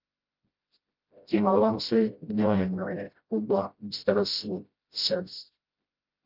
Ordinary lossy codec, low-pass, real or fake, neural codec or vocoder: Opus, 24 kbps; 5.4 kHz; fake; codec, 16 kHz, 0.5 kbps, FreqCodec, smaller model